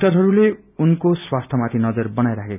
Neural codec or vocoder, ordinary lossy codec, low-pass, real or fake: none; none; 3.6 kHz; real